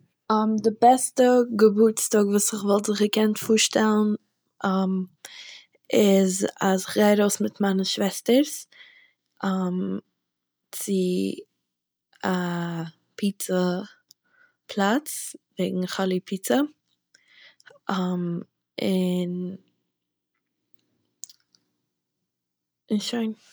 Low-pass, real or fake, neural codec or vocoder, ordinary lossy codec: none; real; none; none